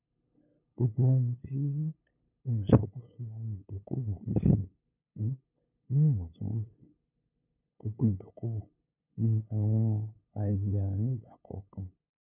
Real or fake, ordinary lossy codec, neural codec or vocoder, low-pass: fake; none; codec, 16 kHz, 8 kbps, FunCodec, trained on LibriTTS, 25 frames a second; 3.6 kHz